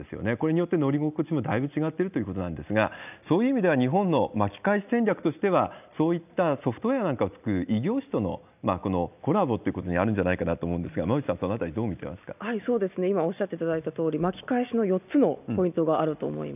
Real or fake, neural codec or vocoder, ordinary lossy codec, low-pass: real; none; none; 3.6 kHz